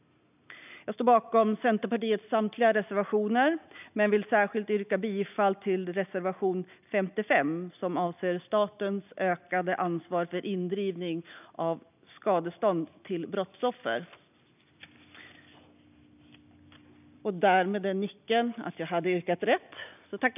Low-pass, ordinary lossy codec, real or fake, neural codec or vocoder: 3.6 kHz; none; real; none